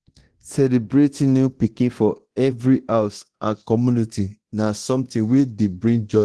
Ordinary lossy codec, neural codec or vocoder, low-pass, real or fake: Opus, 16 kbps; codec, 24 kHz, 0.9 kbps, DualCodec; 10.8 kHz; fake